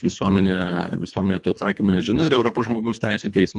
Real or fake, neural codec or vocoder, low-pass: fake; codec, 24 kHz, 1.5 kbps, HILCodec; 10.8 kHz